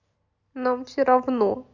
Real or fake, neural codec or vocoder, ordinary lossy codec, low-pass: real; none; none; 7.2 kHz